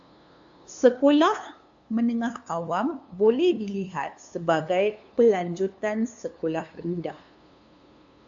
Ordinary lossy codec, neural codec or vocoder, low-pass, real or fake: AAC, 64 kbps; codec, 16 kHz, 2 kbps, FunCodec, trained on LibriTTS, 25 frames a second; 7.2 kHz; fake